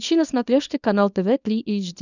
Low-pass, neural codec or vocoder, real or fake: 7.2 kHz; codec, 24 kHz, 0.9 kbps, WavTokenizer, small release; fake